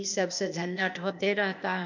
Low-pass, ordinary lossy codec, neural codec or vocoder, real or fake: 7.2 kHz; none; codec, 16 kHz, 0.8 kbps, ZipCodec; fake